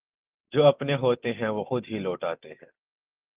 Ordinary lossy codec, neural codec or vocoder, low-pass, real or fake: Opus, 32 kbps; none; 3.6 kHz; real